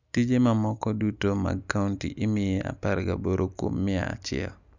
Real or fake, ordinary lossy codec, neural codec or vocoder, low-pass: real; none; none; 7.2 kHz